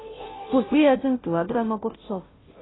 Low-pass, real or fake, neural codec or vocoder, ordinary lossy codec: 7.2 kHz; fake; codec, 16 kHz, 0.5 kbps, FunCodec, trained on Chinese and English, 25 frames a second; AAC, 16 kbps